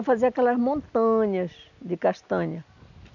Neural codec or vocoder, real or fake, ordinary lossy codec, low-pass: none; real; none; 7.2 kHz